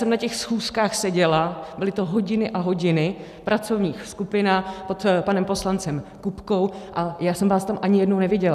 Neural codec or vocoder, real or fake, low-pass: none; real; 14.4 kHz